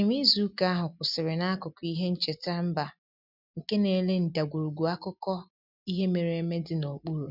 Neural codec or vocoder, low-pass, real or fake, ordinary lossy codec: none; 5.4 kHz; real; none